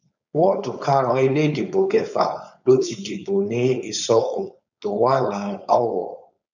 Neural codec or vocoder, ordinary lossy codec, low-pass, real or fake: codec, 16 kHz, 4.8 kbps, FACodec; none; 7.2 kHz; fake